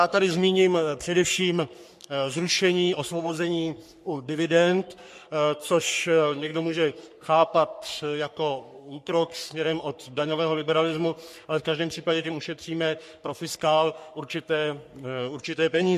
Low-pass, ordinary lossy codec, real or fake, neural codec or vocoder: 14.4 kHz; MP3, 64 kbps; fake; codec, 44.1 kHz, 3.4 kbps, Pupu-Codec